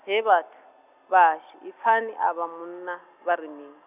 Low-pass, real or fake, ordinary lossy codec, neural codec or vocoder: 3.6 kHz; real; none; none